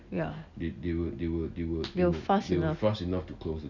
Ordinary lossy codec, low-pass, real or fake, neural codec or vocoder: none; 7.2 kHz; real; none